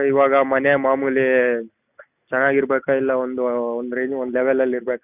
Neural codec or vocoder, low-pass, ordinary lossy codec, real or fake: none; 3.6 kHz; none; real